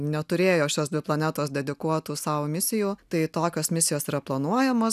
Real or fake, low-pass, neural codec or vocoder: real; 14.4 kHz; none